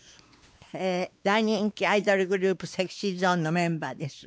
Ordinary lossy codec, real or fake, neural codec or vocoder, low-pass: none; fake; codec, 16 kHz, 2 kbps, X-Codec, WavLM features, trained on Multilingual LibriSpeech; none